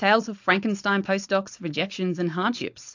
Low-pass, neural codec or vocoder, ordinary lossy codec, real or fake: 7.2 kHz; codec, 16 kHz, 4.8 kbps, FACodec; AAC, 48 kbps; fake